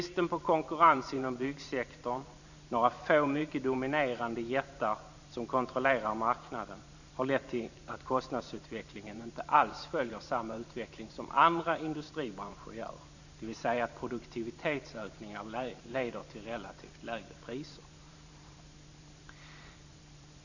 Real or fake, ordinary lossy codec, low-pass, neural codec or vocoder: fake; none; 7.2 kHz; vocoder, 44.1 kHz, 128 mel bands every 512 samples, BigVGAN v2